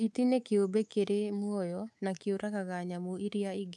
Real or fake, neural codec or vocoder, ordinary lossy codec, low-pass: fake; codec, 24 kHz, 3.1 kbps, DualCodec; none; none